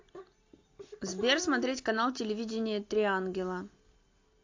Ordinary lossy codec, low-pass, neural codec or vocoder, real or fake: AAC, 48 kbps; 7.2 kHz; none; real